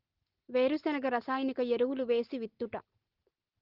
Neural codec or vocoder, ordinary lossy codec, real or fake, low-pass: none; Opus, 16 kbps; real; 5.4 kHz